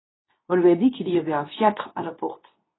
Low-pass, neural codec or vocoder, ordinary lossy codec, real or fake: 7.2 kHz; codec, 24 kHz, 0.9 kbps, WavTokenizer, medium speech release version 2; AAC, 16 kbps; fake